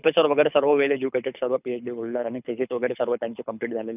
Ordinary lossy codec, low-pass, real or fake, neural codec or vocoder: none; 3.6 kHz; fake; codec, 24 kHz, 6 kbps, HILCodec